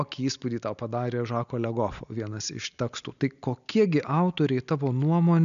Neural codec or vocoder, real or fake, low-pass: none; real; 7.2 kHz